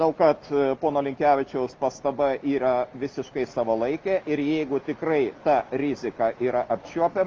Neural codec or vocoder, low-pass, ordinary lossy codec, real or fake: none; 7.2 kHz; Opus, 24 kbps; real